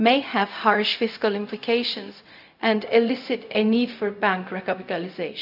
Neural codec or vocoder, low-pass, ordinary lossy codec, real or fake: codec, 16 kHz, 0.4 kbps, LongCat-Audio-Codec; 5.4 kHz; none; fake